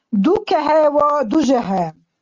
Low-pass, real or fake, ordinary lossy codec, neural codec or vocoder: 7.2 kHz; real; Opus, 32 kbps; none